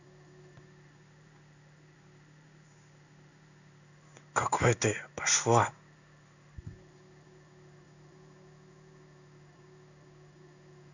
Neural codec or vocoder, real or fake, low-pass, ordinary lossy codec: codec, 16 kHz in and 24 kHz out, 1 kbps, XY-Tokenizer; fake; 7.2 kHz; none